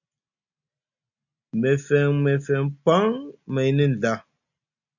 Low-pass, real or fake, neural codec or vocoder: 7.2 kHz; real; none